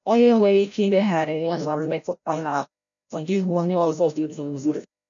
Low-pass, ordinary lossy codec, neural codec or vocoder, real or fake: 7.2 kHz; none; codec, 16 kHz, 0.5 kbps, FreqCodec, larger model; fake